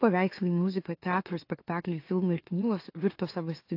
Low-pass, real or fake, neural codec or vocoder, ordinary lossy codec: 5.4 kHz; fake; autoencoder, 44.1 kHz, a latent of 192 numbers a frame, MeloTTS; AAC, 32 kbps